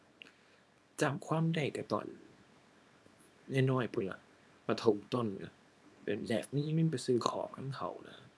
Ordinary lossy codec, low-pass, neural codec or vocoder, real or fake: none; none; codec, 24 kHz, 0.9 kbps, WavTokenizer, small release; fake